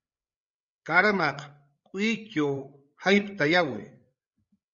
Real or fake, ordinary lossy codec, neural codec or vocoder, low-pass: fake; Opus, 64 kbps; codec, 16 kHz, 8 kbps, FreqCodec, larger model; 7.2 kHz